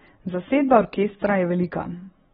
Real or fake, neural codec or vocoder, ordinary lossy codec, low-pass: real; none; AAC, 16 kbps; 10.8 kHz